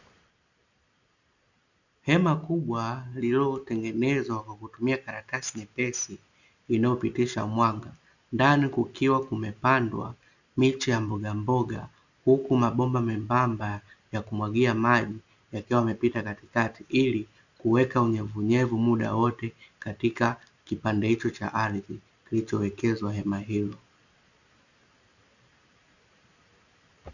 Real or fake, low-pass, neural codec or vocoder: real; 7.2 kHz; none